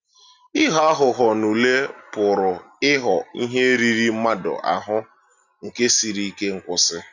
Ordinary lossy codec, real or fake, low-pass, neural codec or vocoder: none; real; 7.2 kHz; none